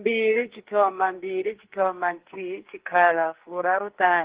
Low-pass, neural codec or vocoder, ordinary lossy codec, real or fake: 3.6 kHz; codec, 44.1 kHz, 2.6 kbps, SNAC; Opus, 16 kbps; fake